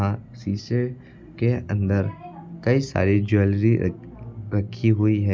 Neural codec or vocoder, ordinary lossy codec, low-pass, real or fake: none; none; none; real